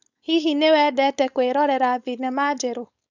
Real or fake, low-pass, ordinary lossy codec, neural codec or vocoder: fake; 7.2 kHz; none; codec, 16 kHz, 4.8 kbps, FACodec